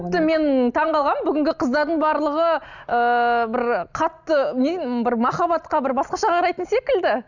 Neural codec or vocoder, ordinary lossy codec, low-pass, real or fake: none; none; 7.2 kHz; real